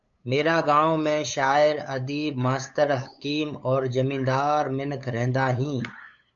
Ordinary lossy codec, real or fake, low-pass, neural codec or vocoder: AAC, 64 kbps; fake; 7.2 kHz; codec, 16 kHz, 8 kbps, FunCodec, trained on LibriTTS, 25 frames a second